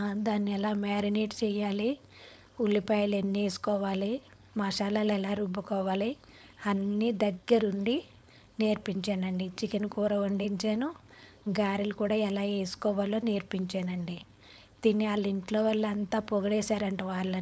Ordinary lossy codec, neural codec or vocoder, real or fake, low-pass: none; codec, 16 kHz, 4.8 kbps, FACodec; fake; none